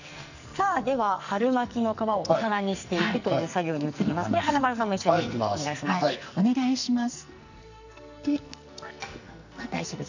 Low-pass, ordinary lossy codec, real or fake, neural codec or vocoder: 7.2 kHz; none; fake; codec, 44.1 kHz, 2.6 kbps, SNAC